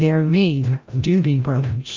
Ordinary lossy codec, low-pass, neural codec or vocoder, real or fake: Opus, 24 kbps; 7.2 kHz; codec, 16 kHz, 0.5 kbps, FreqCodec, larger model; fake